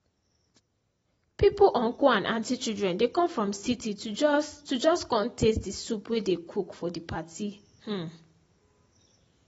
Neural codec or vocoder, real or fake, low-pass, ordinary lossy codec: none; real; 19.8 kHz; AAC, 24 kbps